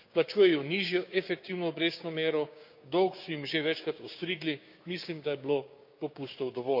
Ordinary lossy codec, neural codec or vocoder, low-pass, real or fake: none; codec, 16 kHz, 6 kbps, DAC; 5.4 kHz; fake